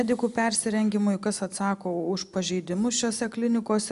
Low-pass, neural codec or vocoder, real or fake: 10.8 kHz; none; real